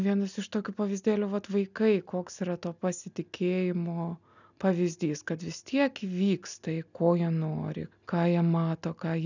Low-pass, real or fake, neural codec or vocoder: 7.2 kHz; real; none